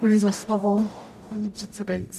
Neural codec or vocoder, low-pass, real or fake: codec, 44.1 kHz, 0.9 kbps, DAC; 14.4 kHz; fake